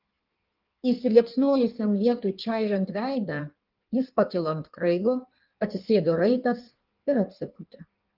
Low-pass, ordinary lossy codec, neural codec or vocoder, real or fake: 5.4 kHz; Opus, 32 kbps; codec, 16 kHz in and 24 kHz out, 1.1 kbps, FireRedTTS-2 codec; fake